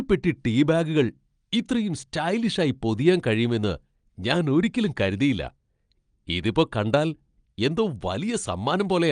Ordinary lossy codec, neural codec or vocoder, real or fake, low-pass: Opus, 32 kbps; none; real; 9.9 kHz